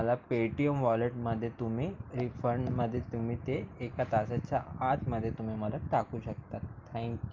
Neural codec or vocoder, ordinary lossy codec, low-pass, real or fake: none; Opus, 32 kbps; 7.2 kHz; real